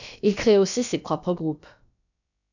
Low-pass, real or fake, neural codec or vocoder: 7.2 kHz; fake; codec, 16 kHz, about 1 kbps, DyCAST, with the encoder's durations